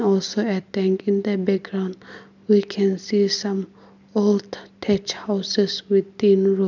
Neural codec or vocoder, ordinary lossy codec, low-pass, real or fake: none; none; 7.2 kHz; real